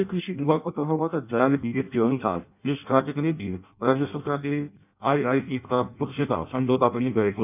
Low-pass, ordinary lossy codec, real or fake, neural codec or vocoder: 3.6 kHz; none; fake; codec, 16 kHz in and 24 kHz out, 0.6 kbps, FireRedTTS-2 codec